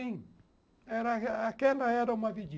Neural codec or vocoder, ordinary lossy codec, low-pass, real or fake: none; none; none; real